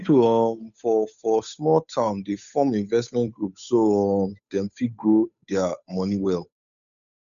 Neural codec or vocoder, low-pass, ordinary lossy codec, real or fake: codec, 16 kHz, 8 kbps, FunCodec, trained on Chinese and English, 25 frames a second; 7.2 kHz; none; fake